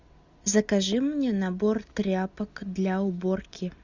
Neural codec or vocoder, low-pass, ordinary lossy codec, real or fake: none; 7.2 kHz; Opus, 64 kbps; real